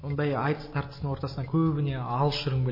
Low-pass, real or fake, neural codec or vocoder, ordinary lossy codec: 5.4 kHz; real; none; MP3, 24 kbps